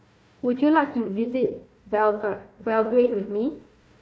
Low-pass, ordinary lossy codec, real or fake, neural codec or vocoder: none; none; fake; codec, 16 kHz, 1 kbps, FunCodec, trained on Chinese and English, 50 frames a second